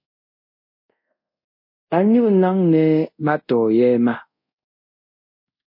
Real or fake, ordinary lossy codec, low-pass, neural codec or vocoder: fake; MP3, 32 kbps; 5.4 kHz; codec, 24 kHz, 0.5 kbps, DualCodec